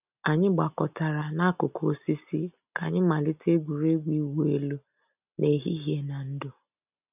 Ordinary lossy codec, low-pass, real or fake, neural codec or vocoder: none; 3.6 kHz; real; none